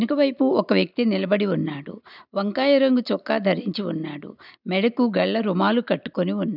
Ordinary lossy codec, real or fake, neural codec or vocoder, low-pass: none; real; none; 5.4 kHz